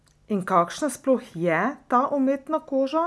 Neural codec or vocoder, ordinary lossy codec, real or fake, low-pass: none; none; real; none